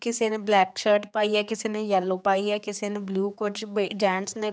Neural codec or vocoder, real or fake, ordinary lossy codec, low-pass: codec, 16 kHz, 4 kbps, X-Codec, HuBERT features, trained on general audio; fake; none; none